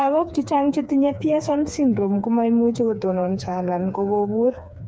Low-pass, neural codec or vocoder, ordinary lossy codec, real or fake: none; codec, 16 kHz, 4 kbps, FreqCodec, smaller model; none; fake